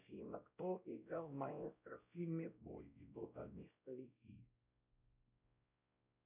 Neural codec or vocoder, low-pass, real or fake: codec, 16 kHz, 0.5 kbps, X-Codec, WavLM features, trained on Multilingual LibriSpeech; 3.6 kHz; fake